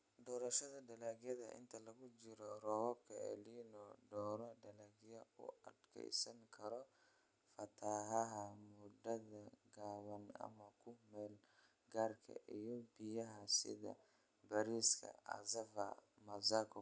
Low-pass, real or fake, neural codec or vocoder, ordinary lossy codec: none; real; none; none